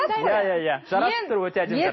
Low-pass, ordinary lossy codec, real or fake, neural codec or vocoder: 7.2 kHz; MP3, 24 kbps; real; none